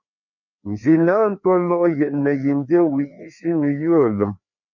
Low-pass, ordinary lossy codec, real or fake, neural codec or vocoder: 7.2 kHz; MP3, 48 kbps; fake; codec, 16 kHz, 2 kbps, FreqCodec, larger model